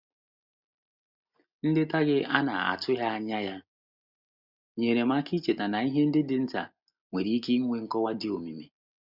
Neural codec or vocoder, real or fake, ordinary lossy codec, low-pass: none; real; Opus, 64 kbps; 5.4 kHz